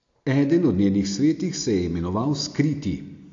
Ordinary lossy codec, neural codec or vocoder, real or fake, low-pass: AAC, 48 kbps; none; real; 7.2 kHz